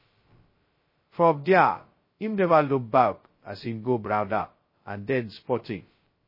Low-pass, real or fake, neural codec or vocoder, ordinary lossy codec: 5.4 kHz; fake; codec, 16 kHz, 0.2 kbps, FocalCodec; MP3, 24 kbps